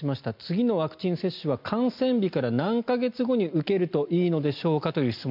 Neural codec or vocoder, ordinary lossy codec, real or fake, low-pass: none; MP3, 48 kbps; real; 5.4 kHz